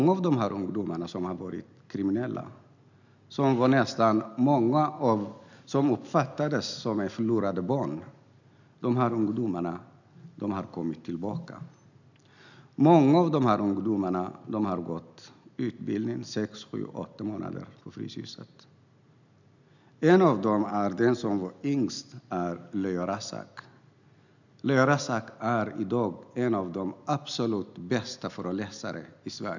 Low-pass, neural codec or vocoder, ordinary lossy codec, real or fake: 7.2 kHz; none; none; real